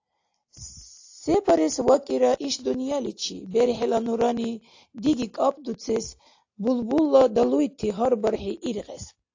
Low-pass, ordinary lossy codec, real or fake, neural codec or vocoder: 7.2 kHz; AAC, 48 kbps; real; none